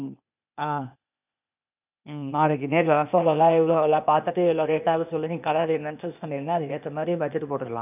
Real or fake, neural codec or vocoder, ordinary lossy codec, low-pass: fake; codec, 16 kHz, 0.8 kbps, ZipCodec; none; 3.6 kHz